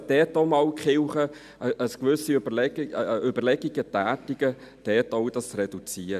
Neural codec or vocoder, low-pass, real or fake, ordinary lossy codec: none; 14.4 kHz; real; none